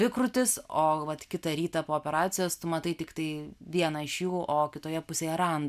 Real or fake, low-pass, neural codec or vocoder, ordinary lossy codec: real; 14.4 kHz; none; MP3, 96 kbps